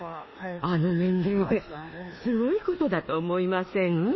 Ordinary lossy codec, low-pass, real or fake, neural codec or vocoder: MP3, 24 kbps; 7.2 kHz; fake; codec, 24 kHz, 1.2 kbps, DualCodec